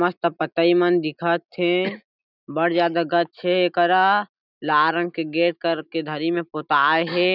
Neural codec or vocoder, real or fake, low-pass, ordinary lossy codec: none; real; 5.4 kHz; none